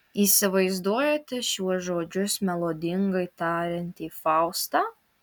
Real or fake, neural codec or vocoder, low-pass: real; none; 19.8 kHz